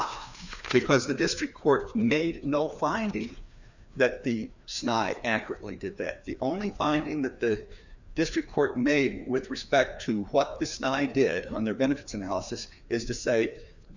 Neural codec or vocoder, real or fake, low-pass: codec, 16 kHz, 2 kbps, FreqCodec, larger model; fake; 7.2 kHz